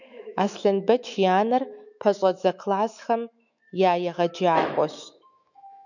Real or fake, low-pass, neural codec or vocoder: fake; 7.2 kHz; autoencoder, 48 kHz, 128 numbers a frame, DAC-VAE, trained on Japanese speech